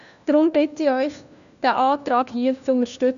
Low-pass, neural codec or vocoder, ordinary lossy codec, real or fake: 7.2 kHz; codec, 16 kHz, 1 kbps, FunCodec, trained on LibriTTS, 50 frames a second; none; fake